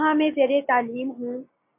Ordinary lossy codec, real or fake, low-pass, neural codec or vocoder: MP3, 32 kbps; real; 3.6 kHz; none